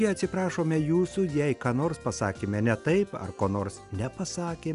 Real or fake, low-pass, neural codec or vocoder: real; 10.8 kHz; none